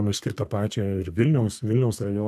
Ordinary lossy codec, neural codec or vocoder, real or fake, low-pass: MP3, 96 kbps; codec, 32 kHz, 1.9 kbps, SNAC; fake; 14.4 kHz